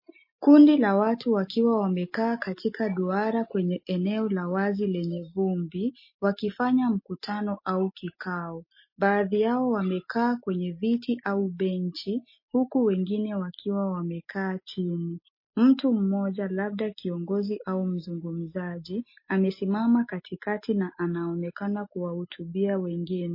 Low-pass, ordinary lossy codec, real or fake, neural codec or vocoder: 5.4 kHz; MP3, 24 kbps; real; none